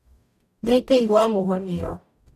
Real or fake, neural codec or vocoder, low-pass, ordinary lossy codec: fake; codec, 44.1 kHz, 0.9 kbps, DAC; 14.4 kHz; MP3, 96 kbps